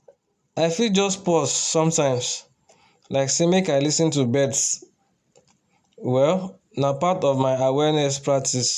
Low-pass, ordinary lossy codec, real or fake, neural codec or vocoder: 14.4 kHz; none; real; none